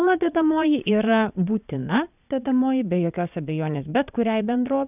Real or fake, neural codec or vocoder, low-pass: fake; vocoder, 22.05 kHz, 80 mel bands, Vocos; 3.6 kHz